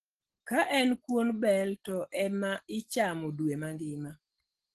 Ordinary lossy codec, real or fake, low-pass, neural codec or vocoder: Opus, 16 kbps; real; 10.8 kHz; none